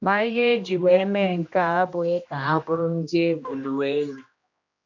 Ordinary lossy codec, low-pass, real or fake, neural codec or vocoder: none; 7.2 kHz; fake; codec, 16 kHz, 1 kbps, X-Codec, HuBERT features, trained on general audio